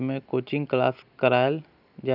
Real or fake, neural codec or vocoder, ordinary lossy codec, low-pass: real; none; none; 5.4 kHz